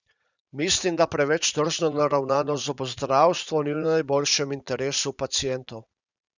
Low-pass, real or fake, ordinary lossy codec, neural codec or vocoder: 7.2 kHz; fake; none; vocoder, 44.1 kHz, 80 mel bands, Vocos